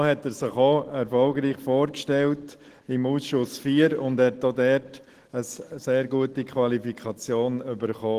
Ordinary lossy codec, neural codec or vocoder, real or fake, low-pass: Opus, 16 kbps; none; real; 14.4 kHz